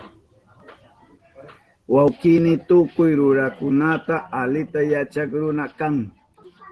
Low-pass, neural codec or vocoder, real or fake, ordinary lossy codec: 10.8 kHz; none; real; Opus, 16 kbps